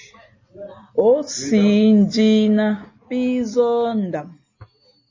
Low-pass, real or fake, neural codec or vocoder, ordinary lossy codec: 7.2 kHz; real; none; MP3, 32 kbps